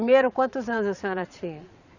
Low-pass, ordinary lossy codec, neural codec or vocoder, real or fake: 7.2 kHz; none; vocoder, 22.05 kHz, 80 mel bands, Vocos; fake